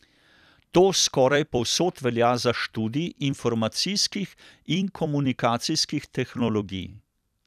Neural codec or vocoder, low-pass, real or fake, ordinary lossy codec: vocoder, 44.1 kHz, 128 mel bands every 256 samples, BigVGAN v2; 14.4 kHz; fake; none